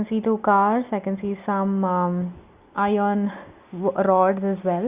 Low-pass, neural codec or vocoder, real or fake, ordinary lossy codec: 3.6 kHz; none; real; Opus, 64 kbps